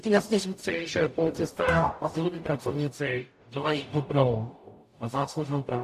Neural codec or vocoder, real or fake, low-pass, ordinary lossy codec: codec, 44.1 kHz, 0.9 kbps, DAC; fake; 14.4 kHz; AAC, 64 kbps